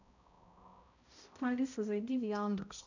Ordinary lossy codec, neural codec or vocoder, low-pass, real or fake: none; codec, 16 kHz, 0.5 kbps, X-Codec, HuBERT features, trained on balanced general audio; 7.2 kHz; fake